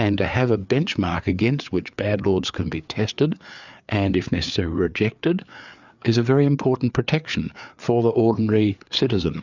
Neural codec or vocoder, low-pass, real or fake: codec, 16 kHz, 4 kbps, FreqCodec, larger model; 7.2 kHz; fake